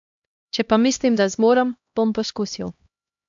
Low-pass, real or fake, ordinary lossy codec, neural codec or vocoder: 7.2 kHz; fake; AAC, 64 kbps; codec, 16 kHz, 1 kbps, X-Codec, HuBERT features, trained on LibriSpeech